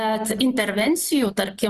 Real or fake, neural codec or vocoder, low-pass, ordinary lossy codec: real; none; 14.4 kHz; Opus, 24 kbps